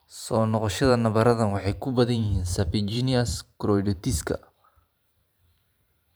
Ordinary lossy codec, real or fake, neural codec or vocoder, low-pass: none; fake; vocoder, 44.1 kHz, 128 mel bands every 512 samples, BigVGAN v2; none